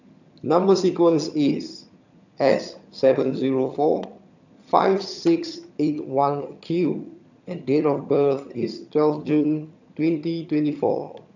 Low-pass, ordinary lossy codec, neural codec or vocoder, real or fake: 7.2 kHz; none; vocoder, 22.05 kHz, 80 mel bands, HiFi-GAN; fake